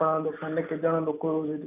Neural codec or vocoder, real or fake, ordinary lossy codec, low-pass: none; real; none; 3.6 kHz